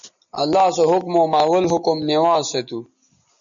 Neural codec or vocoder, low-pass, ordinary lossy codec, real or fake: none; 7.2 kHz; MP3, 64 kbps; real